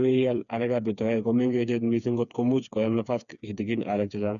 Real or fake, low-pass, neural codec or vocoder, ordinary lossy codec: fake; 7.2 kHz; codec, 16 kHz, 4 kbps, FreqCodec, smaller model; none